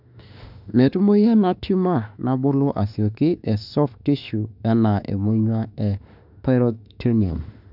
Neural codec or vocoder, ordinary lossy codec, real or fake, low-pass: autoencoder, 48 kHz, 32 numbers a frame, DAC-VAE, trained on Japanese speech; none; fake; 5.4 kHz